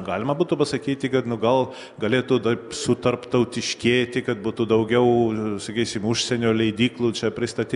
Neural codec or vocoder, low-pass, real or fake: none; 10.8 kHz; real